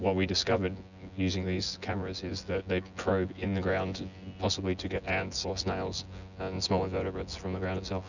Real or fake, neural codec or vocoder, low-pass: fake; vocoder, 24 kHz, 100 mel bands, Vocos; 7.2 kHz